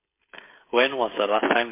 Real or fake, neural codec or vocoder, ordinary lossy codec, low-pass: fake; codec, 16 kHz, 16 kbps, FreqCodec, smaller model; MP3, 24 kbps; 3.6 kHz